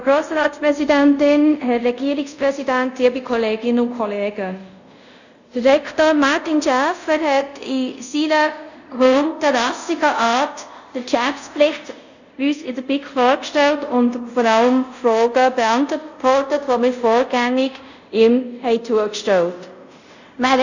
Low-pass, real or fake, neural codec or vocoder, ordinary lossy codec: 7.2 kHz; fake; codec, 24 kHz, 0.5 kbps, DualCodec; none